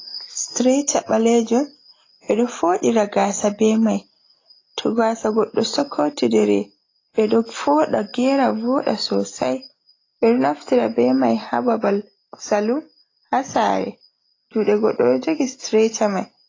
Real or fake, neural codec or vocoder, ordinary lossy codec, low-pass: real; none; AAC, 32 kbps; 7.2 kHz